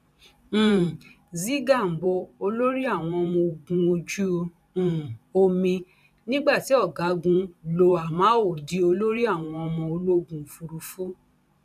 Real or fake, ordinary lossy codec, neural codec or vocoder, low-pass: fake; none; vocoder, 44.1 kHz, 128 mel bands every 512 samples, BigVGAN v2; 14.4 kHz